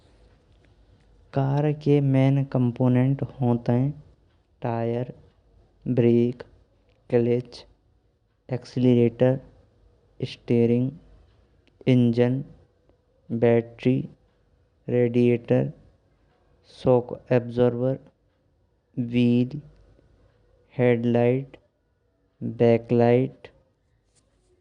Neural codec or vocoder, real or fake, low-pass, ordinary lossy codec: none; real; 9.9 kHz; Opus, 64 kbps